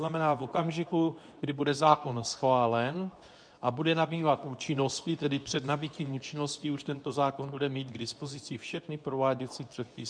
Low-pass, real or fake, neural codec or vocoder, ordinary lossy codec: 9.9 kHz; fake; codec, 24 kHz, 0.9 kbps, WavTokenizer, medium speech release version 2; MP3, 96 kbps